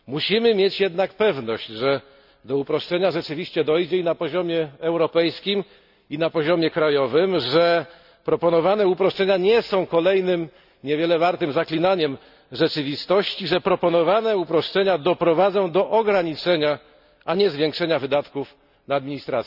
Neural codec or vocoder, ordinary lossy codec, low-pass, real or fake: none; none; 5.4 kHz; real